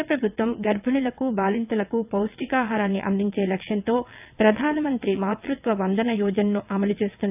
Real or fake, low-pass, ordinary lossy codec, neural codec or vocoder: fake; 3.6 kHz; AAC, 32 kbps; vocoder, 22.05 kHz, 80 mel bands, WaveNeXt